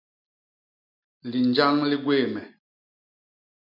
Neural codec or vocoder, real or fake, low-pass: none; real; 5.4 kHz